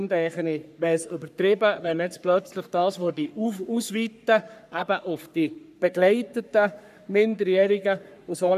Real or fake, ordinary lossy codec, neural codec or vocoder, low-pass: fake; none; codec, 44.1 kHz, 3.4 kbps, Pupu-Codec; 14.4 kHz